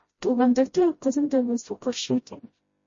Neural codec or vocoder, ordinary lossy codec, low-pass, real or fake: codec, 16 kHz, 0.5 kbps, FreqCodec, smaller model; MP3, 32 kbps; 7.2 kHz; fake